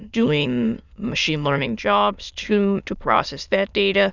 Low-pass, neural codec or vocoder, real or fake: 7.2 kHz; autoencoder, 22.05 kHz, a latent of 192 numbers a frame, VITS, trained on many speakers; fake